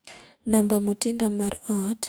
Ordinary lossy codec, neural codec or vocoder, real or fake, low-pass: none; codec, 44.1 kHz, 2.6 kbps, DAC; fake; none